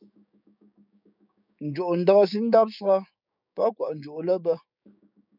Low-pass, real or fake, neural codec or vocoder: 5.4 kHz; fake; codec, 16 kHz, 6 kbps, DAC